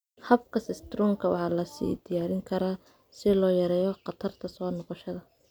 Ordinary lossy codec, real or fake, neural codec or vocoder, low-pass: none; real; none; none